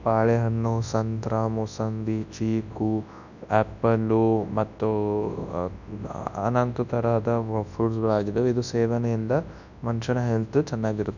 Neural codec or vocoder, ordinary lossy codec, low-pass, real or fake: codec, 24 kHz, 0.9 kbps, WavTokenizer, large speech release; none; 7.2 kHz; fake